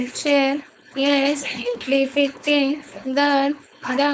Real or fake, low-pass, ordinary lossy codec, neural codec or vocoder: fake; none; none; codec, 16 kHz, 4.8 kbps, FACodec